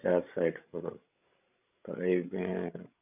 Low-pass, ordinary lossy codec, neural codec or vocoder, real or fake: 3.6 kHz; none; codec, 16 kHz, 16 kbps, FreqCodec, larger model; fake